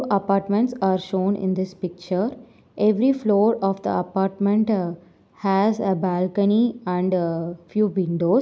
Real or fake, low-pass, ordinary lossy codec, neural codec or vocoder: real; none; none; none